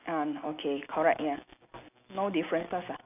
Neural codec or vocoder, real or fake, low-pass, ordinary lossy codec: none; real; 3.6 kHz; none